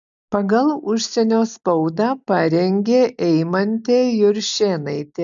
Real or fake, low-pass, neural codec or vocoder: real; 7.2 kHz; none